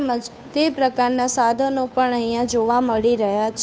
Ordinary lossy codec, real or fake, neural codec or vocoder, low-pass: none; fake; codec, 16 kHz, 2 kbps, FunCodec, trained on Chinese and English, 25 frames a second; none